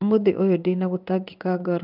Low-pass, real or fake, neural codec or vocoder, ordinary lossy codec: 5.4 kHz; fake; codec, 16 kHz in and 24 kHz out, 1 kbps, XY-Tokenizer; none